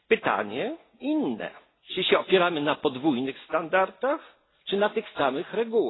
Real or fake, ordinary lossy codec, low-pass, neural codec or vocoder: real; AAC, 16 kbps; 7.2 kHz; none